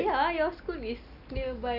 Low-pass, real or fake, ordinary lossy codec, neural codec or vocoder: 5.4 kHz; real; none; none